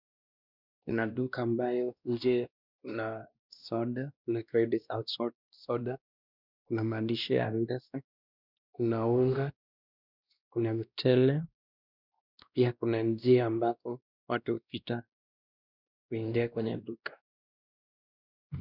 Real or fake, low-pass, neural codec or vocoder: fake; 5.4 kHz; codec, 16 kHz, 1 kbps, X-Codec, WavLM features, trained on Multilingual LibriSpeech